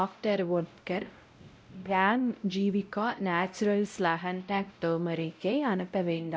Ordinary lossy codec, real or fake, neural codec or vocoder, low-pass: none; fake; codec, 16 kHz, 0.5 kbps, X-Codec, WavLM features, trained on Multilingual LibriSpeech; none